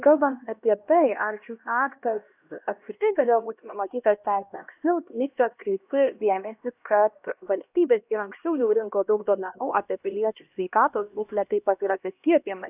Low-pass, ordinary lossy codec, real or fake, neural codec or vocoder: 3.6 kHz; AAC, 32 kbps; fake; codec, 16 kHz, 1 kbps, X-Codec, HuBERT features, trained on LibriSpeech